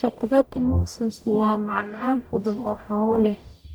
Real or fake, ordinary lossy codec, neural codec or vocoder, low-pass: fake; none; codec, 44.1 kHz, 0.9 kbps, DAC; none